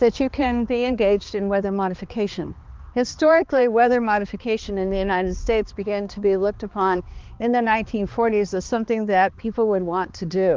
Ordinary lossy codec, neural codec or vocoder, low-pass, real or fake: Opus, 32 kbps; codec, 16 kHz, 2 kbps, X-Codec, HuBERT features, trained on balanced general audio; 7.2 kHz; fake